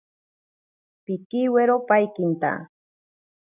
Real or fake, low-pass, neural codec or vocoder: real; 3.6 kHz; none